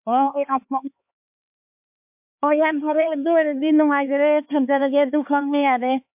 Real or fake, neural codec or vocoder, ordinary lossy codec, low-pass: fake; codec, 16 kHz, 4 kbps, X-Codec, HuBERT features, trained on LibriSpeech; MP3, 32 kbps; 3.6 kHz